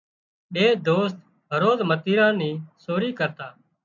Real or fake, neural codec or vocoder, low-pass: real; none; 7.2 kHz